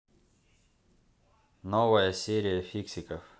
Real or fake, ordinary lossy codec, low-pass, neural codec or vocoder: real; none; none; none